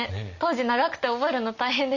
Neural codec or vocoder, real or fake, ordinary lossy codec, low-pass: none; real; none; 7.2 kHz